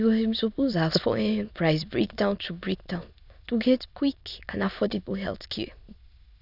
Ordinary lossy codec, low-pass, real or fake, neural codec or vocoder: none; 5.4 kHz; fake; autoencoder, 22.05 kHz, a latent of 192 numbers a frame, VITS, trained on many speakers